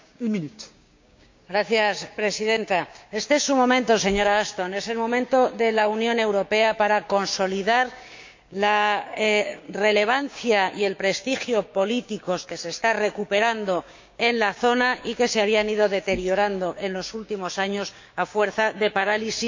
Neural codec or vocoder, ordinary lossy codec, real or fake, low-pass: codec, 16 kHz, 6 kbps, DAC; MP3, 48 kbps; fake; 7.2 kHz